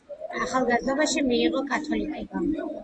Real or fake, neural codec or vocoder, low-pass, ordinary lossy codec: real; none; 9.9 kHz; AAC, 48 kbps